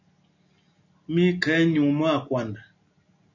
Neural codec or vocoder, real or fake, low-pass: none; real; 7.2 kHz